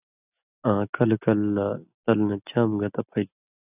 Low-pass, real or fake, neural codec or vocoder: 3.6 kHz; real; none